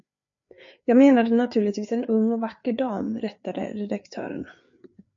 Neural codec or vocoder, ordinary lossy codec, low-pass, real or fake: codec, 16 kHz, 4 kbps, FreqCodec, larger model; MP3, 48 kbps; 7.2 kHz; fake